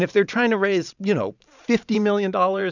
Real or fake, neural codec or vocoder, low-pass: fake; vocoder, 44.1 kHz, 128 mel bands every 512 samples, BigVGAN v2; 7.2 kHz